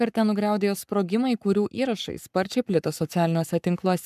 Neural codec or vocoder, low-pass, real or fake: codec, 44.1 kHz, 7.8 kbps, Pupu-Codec; 14.4 kHz; fake